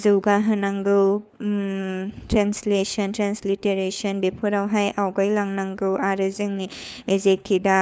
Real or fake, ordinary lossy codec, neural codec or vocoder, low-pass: fake; none; codec, 16 kHz, 4 kbps, FunCodec, trained on LibriTTS, 50 frames a second; none